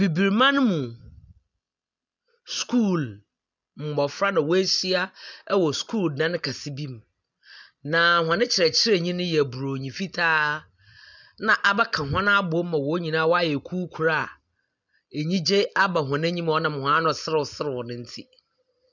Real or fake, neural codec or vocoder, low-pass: real; none; 7.2 kHz